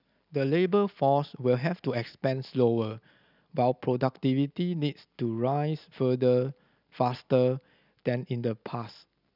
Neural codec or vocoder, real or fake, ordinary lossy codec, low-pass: none; real; none; 5.4 kHz